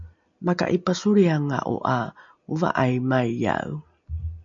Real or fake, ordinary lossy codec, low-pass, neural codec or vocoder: real; MP3, 64 kbps; 7.2 kHz; none